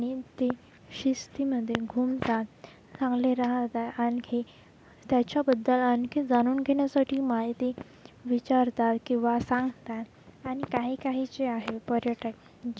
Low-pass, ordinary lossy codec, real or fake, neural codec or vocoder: none; none; real; none